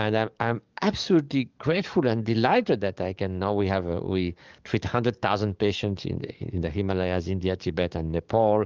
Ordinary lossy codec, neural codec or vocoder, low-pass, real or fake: Opus, 24 kbps; codec, 44.1 kHz, 7.8 kbps, DAC; 7.2 kHz; fake